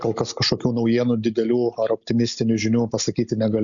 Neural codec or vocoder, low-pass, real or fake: none; 7.2 kHz; real